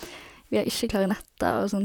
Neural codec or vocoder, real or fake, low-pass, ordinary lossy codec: vocoder, 44.1 kHz, 128 mel bands, Pupu-Vocoder; fake; 19.8 kHz; none